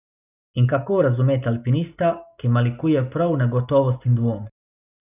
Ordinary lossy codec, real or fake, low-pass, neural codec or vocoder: none; real; 3.6 kHz; none